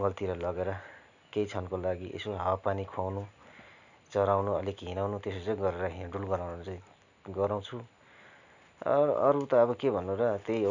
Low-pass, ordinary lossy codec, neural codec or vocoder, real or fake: 7.2 kHz; none; none; real